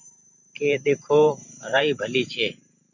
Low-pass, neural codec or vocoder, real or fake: 7.2 kHz; vocoder, 44.1 kHz, 128 mel bands every 512 samples, BigVGAN v2; fake